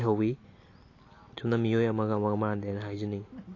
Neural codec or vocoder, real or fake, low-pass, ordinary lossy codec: none; real; 7.2 kHz; AAC, 32 kbps